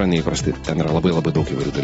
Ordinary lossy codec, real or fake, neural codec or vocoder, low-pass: AAC, 24 kbps; real; none; 19.8 kHz